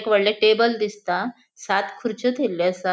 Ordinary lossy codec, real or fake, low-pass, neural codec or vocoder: none; real; none; none